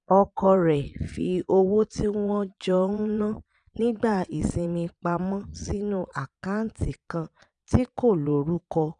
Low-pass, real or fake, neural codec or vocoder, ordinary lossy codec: 9.9 kHz; fake; vocoder, 22.05 kHz, 80 mel bands, Vocos; none